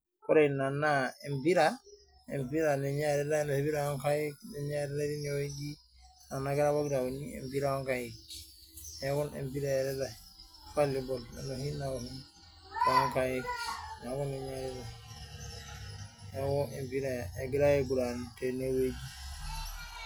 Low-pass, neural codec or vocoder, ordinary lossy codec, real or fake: none; none; none; real